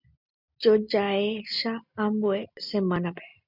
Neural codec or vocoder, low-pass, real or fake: none; 5.4 kHz; real